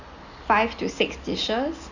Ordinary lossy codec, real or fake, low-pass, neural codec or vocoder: none; real; 7.2 kHz; none